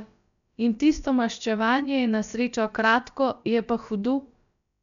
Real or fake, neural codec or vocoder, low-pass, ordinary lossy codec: fake; codec, 16 kHz, about 1 kbps, DyCAST, with the encoder's durations; 7.2 kHz; none